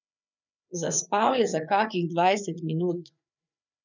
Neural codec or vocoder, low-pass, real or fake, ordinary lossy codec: codec, 16 kHz, 4 kbps, FreqCodec, larger model; 7.2 kHz; fake; none